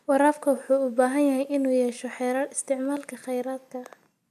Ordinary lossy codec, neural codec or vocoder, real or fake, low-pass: AAC, 96 kbps; none; real; 14.4 kHz